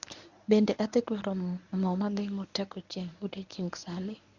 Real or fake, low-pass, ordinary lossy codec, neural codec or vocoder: fake; 7.2 kHz; none; codec, 24 kHz, 0.9 kbps, WavTokenizer, medium speech release version 1